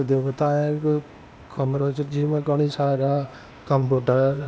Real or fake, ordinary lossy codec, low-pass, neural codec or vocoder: fake; none; none; codec, 16 kHz, 0.8 kbps, ZipCodec